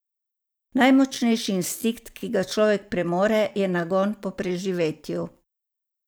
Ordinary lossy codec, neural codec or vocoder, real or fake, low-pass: none; vocoder, 44.1 kHz, 128 mel bands every 512 samples, BigVGAN v2; fake; none